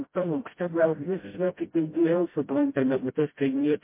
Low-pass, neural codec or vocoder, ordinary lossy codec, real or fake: 3.6 kHz; codec, 16 kHz, 0.5 kbps, FreqCodec, smaller model; MP3, 24 kbps; fake